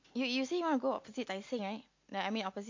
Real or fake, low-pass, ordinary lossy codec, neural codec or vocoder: real; 7.2 kHz; MP3, 48 kbps; none